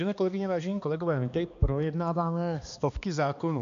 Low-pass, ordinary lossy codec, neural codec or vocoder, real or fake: 7.2 kHz; MP3, 64 kbps; codec, 16 kHz, 2 kbps, X-Codec, HuBERT features, trained on balanced general audio; fake